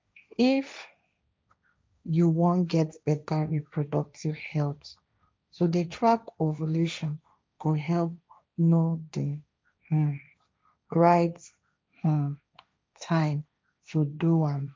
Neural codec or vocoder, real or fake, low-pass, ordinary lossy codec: codec, 16 kHz, 1.1 kbps, Voila-Tokenizer; fake; none; none